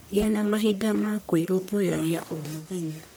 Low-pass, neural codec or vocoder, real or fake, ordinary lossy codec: none; codec, 44.1 kHz, 1.7 kbps, Pupu-Codec; fake; none